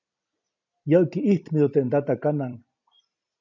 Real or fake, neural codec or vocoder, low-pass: fake; vocoder, 44.1 kHz, 128 mel bands every 256 samples, BigVGAN v2; 7.2 kHz